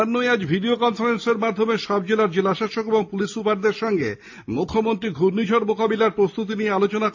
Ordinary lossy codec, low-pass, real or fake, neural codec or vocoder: AAC, 48 kbps; 7.2 kHz; real; none